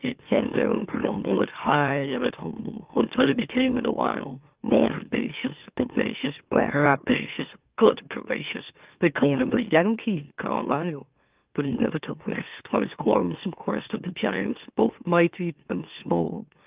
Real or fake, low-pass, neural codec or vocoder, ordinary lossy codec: fake; 3.6 kHz; autoencoder, 44.1 kHz, a latent of 192 numbers a frame, MeloTTS; Opus, 32 kbps